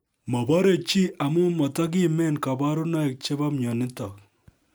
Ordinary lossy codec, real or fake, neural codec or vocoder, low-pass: none; real; none; none